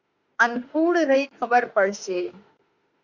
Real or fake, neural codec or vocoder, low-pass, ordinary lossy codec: fake; autoencoder, 48 kHz, 32 numbers a frame, DAC-VAE, trained on Japanese speech; 7.2 kHz; Opus, 64 kbps